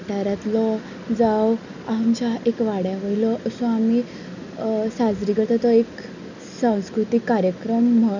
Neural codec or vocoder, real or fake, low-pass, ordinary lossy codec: none; real; 7.2 kHz; none